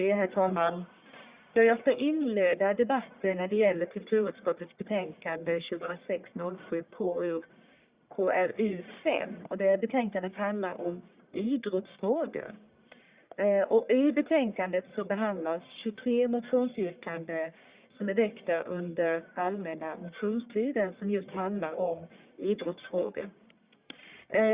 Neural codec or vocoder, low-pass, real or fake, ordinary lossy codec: codec, 44.1 kHz, 1.7 kbps, Pupu-Codec; 3.6 kHz; fake; Opus, 64 kbps